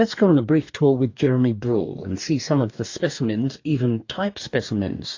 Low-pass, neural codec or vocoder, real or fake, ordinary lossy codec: 7.2 kHz; codec, 44.1 kHz, 2.6 kbps, DAC; fake; AAC, 48 kbps